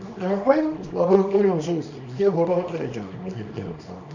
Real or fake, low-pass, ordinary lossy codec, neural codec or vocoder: fake; 7.2 kHz; none; codec, 24 kHz, 0.9 kbps, WavTokenizer, small release